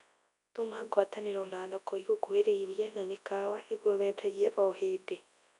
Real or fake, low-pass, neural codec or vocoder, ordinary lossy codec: fake; 10.8 kHz; codec, 24 kHz, 0.9 kbps, WavTokenizer, large speech release; none